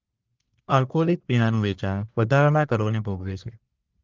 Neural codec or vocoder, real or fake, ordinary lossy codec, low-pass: codec, 44.1 kHz, 1.7 kbps, Pupu-Codec; fake; Opus, 32 kbps; 7.2 kHz